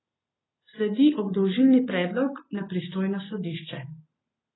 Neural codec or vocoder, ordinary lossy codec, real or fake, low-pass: none; AAC, 16 kbps; real; 7.2 kHz